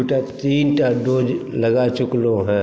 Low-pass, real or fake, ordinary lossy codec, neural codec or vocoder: none; real; none; none